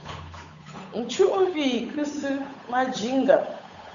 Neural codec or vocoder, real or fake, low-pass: codec, 16 kHz, 8 kbps, FunCodec, trained on Chinese and English, 25 frames a second; fake; 7.2 kHz